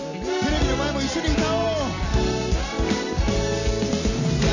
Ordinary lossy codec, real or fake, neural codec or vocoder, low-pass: none; real; none; 7.2 kHz